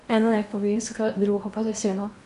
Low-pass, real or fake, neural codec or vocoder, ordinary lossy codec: 10.8 kHz; fake; codec, 16 kHz in and 24 kHz out, 0.8 kbps, FocalCodec, streaming, 65536 codes; none